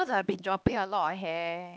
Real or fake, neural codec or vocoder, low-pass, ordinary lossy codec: fake; codec, 16 kHz, 2 kbps, X-Codec, HuBERT features, trained on LibriSpeech; none; none